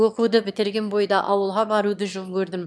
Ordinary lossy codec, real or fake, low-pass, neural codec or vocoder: none; fake; none; autoencoder, 22.05 kHz, a latent of 192 numbers a frame, VITS, trained on one speaker